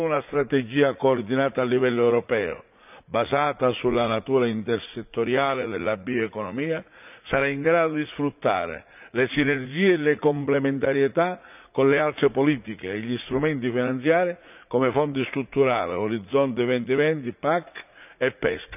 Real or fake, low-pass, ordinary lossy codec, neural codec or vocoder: fake; 3.6 kHz; none; vocoder, 44.1 kHz, 80 mel bands, Vocos